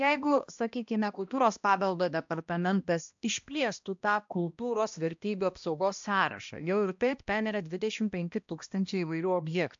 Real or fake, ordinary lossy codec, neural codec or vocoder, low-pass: fake; AAC, 64 kbps; codec, 16 kHz, 1 kbps, X-Codec, HuBERT features, trained on balanced general audio; 7.2 kHz